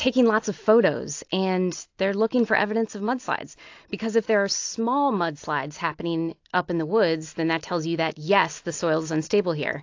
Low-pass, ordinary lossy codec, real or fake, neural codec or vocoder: 7.2 kHz; AAC, 48 kbps; real; none